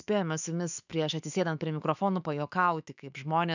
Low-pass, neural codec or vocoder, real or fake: 7.2 kHz; autoencoder, 48 kHz, 128 numbers a frame, DAC-VAE, trained on Japanese speech; fake